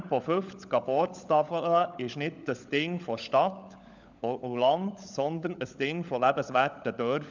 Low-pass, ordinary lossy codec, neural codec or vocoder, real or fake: 7.2 kHz; none; codec, 16 kHz, 16 kbps, FunCodec, trained on LibriTTS, 50 frames a second; fake